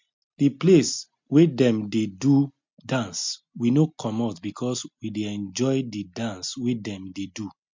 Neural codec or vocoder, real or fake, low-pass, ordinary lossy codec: none; real; 7.2 kHz; MP3, 64 kbps